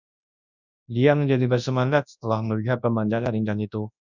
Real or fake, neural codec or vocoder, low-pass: fake; codec, 24 kHz, 0.9 kbps, WavTokenizer, large speech release; 7.2 kHz